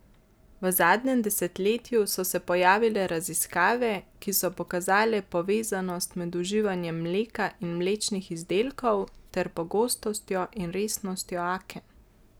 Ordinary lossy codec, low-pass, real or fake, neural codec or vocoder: none; none; real; none